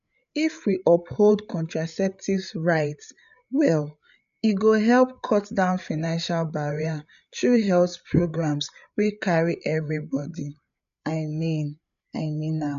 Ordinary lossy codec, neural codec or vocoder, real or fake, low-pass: none; codec, 16 kHz, 8 kbps, FreqCodec, larger model; fake; 7.2 kHz